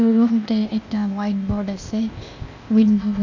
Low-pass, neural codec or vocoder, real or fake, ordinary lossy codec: 7.2 kHz; codec, 16 kHz, 0.8 kbps, ZipCodec; fake; none